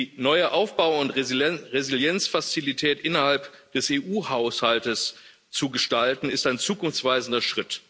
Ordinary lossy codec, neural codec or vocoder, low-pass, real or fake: none; none; none; real